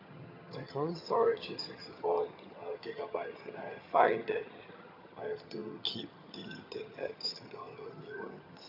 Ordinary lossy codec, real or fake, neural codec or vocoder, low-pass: none; fake; vocoder, 22.05 kHz, 80 mel bands, HiFi-GAN; 5.4 kHz